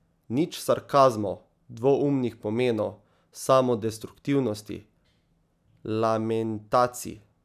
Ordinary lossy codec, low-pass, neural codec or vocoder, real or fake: none; 14.4 kHz; none; real